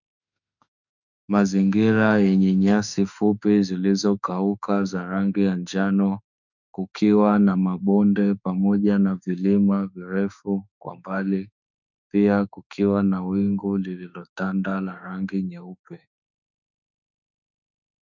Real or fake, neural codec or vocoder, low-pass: fake; autoencoder, 48 kHz, 32 numbers a frame, DAC-VAE, trained on Japanese speech; 7.2 kHz